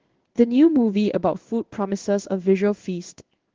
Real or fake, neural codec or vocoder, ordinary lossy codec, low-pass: fake; codec, 16 kHz in and 24 kHz out, 1 kbps, XY-Tokenizer; Opus, 16 kbps; 7.2 kHz